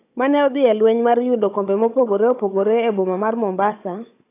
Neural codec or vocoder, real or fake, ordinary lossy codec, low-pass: codec, 16 kHz, 16 kbps, FunCodec, trained on Chinese and English, 50 frames a second; fake; AAC, 24 kbps; 3.6 kHz